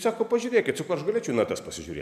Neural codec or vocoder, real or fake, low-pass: none; real; 14.4 kHz